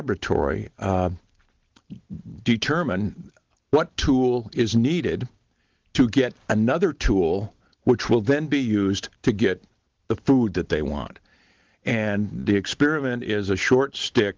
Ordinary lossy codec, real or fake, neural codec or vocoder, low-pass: Opus, 16 kbps; real; none; 7.2 kHz